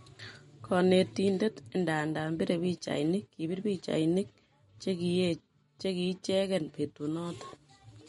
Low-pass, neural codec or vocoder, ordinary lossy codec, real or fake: 19.8 kHz; none; MP3, 48 kbps; real